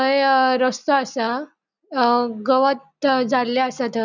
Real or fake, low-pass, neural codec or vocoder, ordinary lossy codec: real; 7.2 kHz; none; none